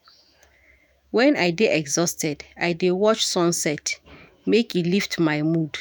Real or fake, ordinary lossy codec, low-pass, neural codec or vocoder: fake; none; none; autoencoder, 48 kHz, 128 numbers a frame, DAC-VAE, trained on Japanese speech